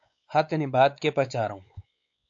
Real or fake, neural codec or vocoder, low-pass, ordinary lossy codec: fake; codec, 16 kHz, 4 kbps, X-Codec, WavLM features, trained on Multilingual LibriSpeech; 7.2 kHz; AAC, 64 kbps